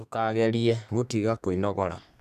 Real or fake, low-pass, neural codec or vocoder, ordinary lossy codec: fake; 14.4 kHz; codec, 32 kHz, 1.9 kbps, SNAC; none